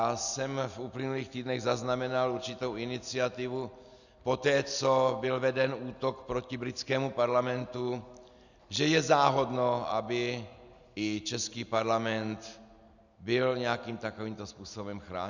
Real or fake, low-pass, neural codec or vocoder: real; 7.2 kHz; none